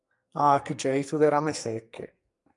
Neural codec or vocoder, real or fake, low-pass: codec, 44.1 kHz, 2.6 kbps, SNAC; fake; 10.8 kHz